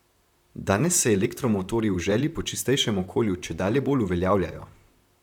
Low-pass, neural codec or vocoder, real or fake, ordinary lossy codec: 19.8 kHz; vocoder, 44.1 kHz, 128 mel bands, Pupu-Vocoder; fake; none